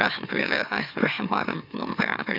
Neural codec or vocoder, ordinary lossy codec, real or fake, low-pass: autoencoder, 44.1 kHz, a latent of 192 numbers a frame, MeloTTS; none; fake; 5.4 kHz